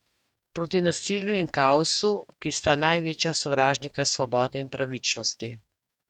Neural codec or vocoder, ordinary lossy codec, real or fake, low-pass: codec, 44.1 kHz, 2.6 kbps, DAC; none; fake; 19.8 kHz